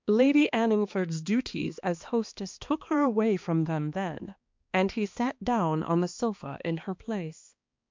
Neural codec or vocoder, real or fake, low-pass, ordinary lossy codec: codec, 16 kHz, 2 kbps, X-Codec, HuBERT features, trained on balanced general audio; fake; 7.2 kHz; MP3, 64 kbps